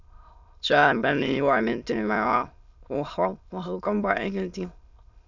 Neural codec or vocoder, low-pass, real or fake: autoencoder, 22.05 kHz, a latent of 192 numbers a frame, VITS, trained on many speakers; 7.2 kHz; fake